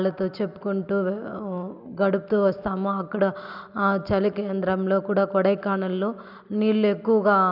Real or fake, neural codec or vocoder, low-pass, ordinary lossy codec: real; none; 5.4 kHz; none